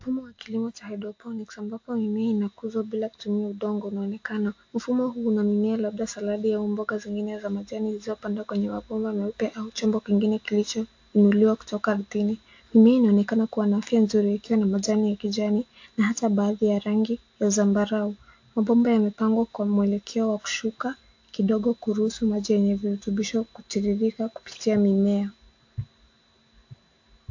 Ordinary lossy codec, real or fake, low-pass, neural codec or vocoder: AAC, 48 kbps; real; 7.2 kHz; none